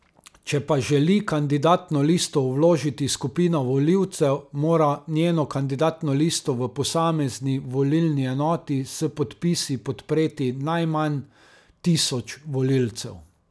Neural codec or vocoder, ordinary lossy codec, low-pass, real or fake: none; none; none; real